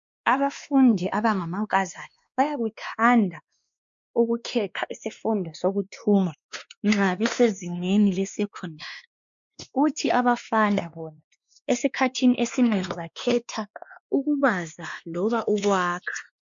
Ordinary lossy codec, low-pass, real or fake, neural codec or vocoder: MP3, 64 kbps; 7.2 kHz; fake; codec, 16 kHz, 2 kbps, X-Codec, WavLM features, trained on Multilingual LibriSpeech